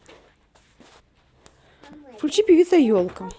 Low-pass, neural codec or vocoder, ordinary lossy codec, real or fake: none; none; none; real